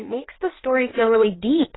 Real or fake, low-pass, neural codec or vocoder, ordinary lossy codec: fake; 7.2 kHz; codec, 16 kHz in and 24 kHz out, 1.1 kbps, FireRedTTS-2 codec; AAC, 16 kbps